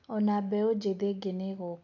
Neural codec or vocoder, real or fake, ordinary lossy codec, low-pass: none; real; AAC, 48 kbps; 7.2 kHz